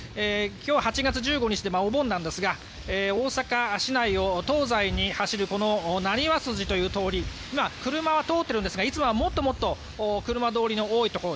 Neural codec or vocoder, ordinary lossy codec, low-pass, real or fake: none; none; none; real